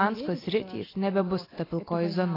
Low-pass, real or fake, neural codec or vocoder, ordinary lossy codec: 5.4 kHz; real; none; AAC, 24 kbps